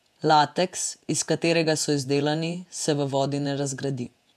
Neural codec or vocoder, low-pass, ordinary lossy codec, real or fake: vocoder, 48 kHz, 128 mel bands, Vocos; 14.4 kHz; AAC, 96 kbps; fake